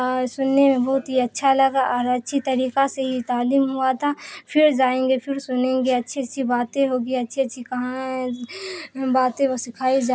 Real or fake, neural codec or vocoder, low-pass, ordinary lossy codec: real; none; none; none